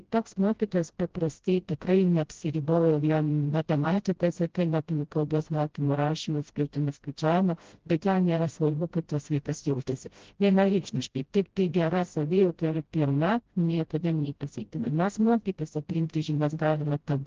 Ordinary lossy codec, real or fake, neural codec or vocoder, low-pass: Opus, 16 kbps; fake; codec, 16 kHz, 0.5 kbps, FreqCodec, smaller model; 7.2 kHz